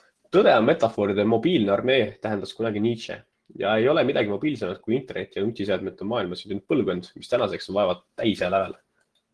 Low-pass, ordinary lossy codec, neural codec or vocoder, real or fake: 10.8 kHz; Opus, 16 kbps; none; real